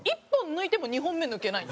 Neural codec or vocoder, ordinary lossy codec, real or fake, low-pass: none; none; real; none